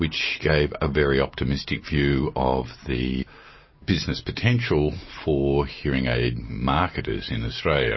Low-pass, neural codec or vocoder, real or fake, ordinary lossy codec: 7.2 kHz; none; real; MP3, 24 kbps